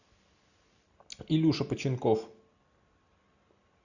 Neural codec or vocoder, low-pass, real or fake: none; 7.2 kHz; real